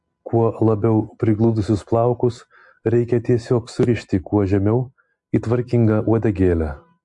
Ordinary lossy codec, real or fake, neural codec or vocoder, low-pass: MP3, 48 kbps; real; none; 10.8 kHz